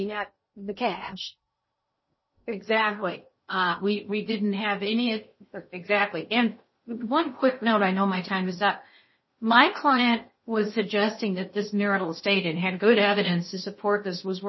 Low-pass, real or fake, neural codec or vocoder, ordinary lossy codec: 7.2 kHz; fake; codec, 16 kHz in and 24 kHz out, 0.6 kbps, FocalCodec, streaming, 2048 codes; MP3, 24 kbps